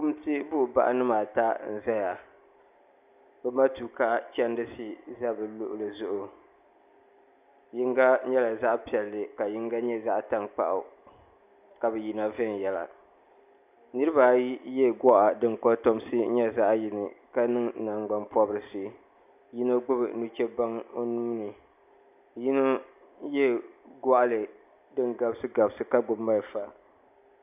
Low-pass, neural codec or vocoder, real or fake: 3.6 kHz; none; real